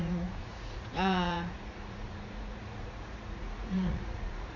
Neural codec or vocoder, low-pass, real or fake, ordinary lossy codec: vocoder, 44.1 kHz, 80 mel bands, Vocos; 7.2 kHz; fake; none